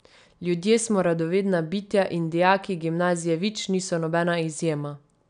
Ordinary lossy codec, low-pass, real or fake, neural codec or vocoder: none; 9.9 kHz; real; none